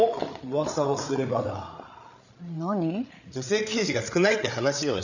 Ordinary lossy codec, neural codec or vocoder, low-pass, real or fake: none; codec, 16 kHz, 8 kbps, FreqCodec, larger model; 7.2 kHz; fake